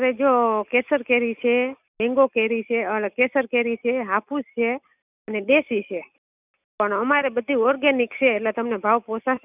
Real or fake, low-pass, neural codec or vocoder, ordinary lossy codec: real; 3.6 kHz; none; none